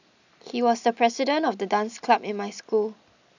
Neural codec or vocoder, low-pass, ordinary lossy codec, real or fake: none; 7.2 kHz; none; real